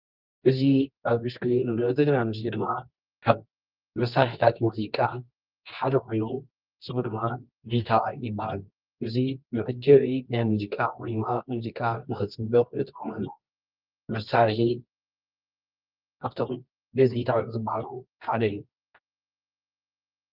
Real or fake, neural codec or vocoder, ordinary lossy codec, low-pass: fake; codec, 24 kHz, 0.9 kbps, WavTokenizer, medium music audio release; Opus, 32 kbps; 5.4 kHz